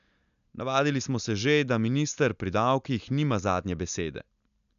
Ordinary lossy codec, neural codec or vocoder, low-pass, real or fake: none; none; 7.2 kHz; real